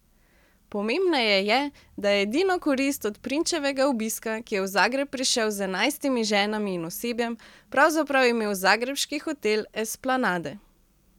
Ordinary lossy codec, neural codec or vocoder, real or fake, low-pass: none; none; real; 19.8 kHz